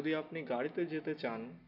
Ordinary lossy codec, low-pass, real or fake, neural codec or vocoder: none; 5.4 kHz; real; none